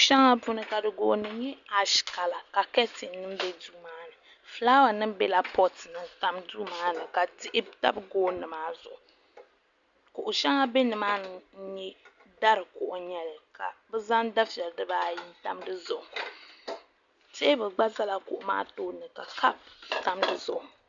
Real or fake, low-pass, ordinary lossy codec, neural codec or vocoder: real; 7.2 kHz; Opus, 64 kbps; none